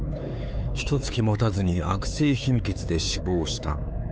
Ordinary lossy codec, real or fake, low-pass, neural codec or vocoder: none; fake; none; codec, 16 kHz, 4 kbps, X-Codec, HuBERT features, trained on LibriSpeech